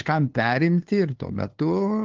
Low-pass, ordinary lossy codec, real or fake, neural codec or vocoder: 7.2 kHz; Opus, 16 kbps; fake; codec, 16 kHz, 2 kbps, FunCodec, trained on LibriTTS, 25 frames a second